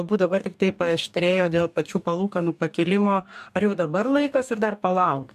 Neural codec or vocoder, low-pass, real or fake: codec, 44.1 kHz, 2.6 kbps, DAC; 14.4 kHz; fake